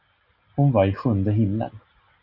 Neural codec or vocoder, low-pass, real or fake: none; 5.4 kHz; real